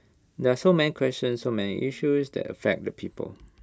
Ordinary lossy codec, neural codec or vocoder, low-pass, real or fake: none; none; none; real